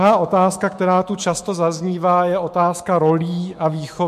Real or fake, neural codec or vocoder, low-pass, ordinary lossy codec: fake; autoencoder, 48 kHz, 128 numbers a frame, DAC-VAE, trained on Japanese speech; 14.4 kHz; MP3, 64 kbps